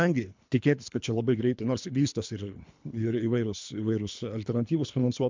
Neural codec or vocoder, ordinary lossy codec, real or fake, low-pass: codec, 24 kHz, 3 kbps, HILCodec; MP3, 64 kbps; fake; 7.2 kHz